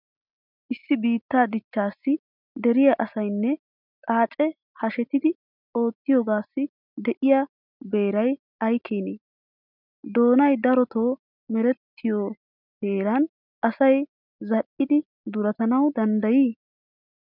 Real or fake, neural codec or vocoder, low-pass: real; none; 5.4 kHz